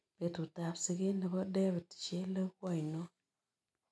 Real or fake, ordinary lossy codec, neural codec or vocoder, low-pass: real; none; none; none